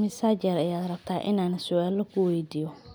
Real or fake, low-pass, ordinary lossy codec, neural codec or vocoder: real; none; none; none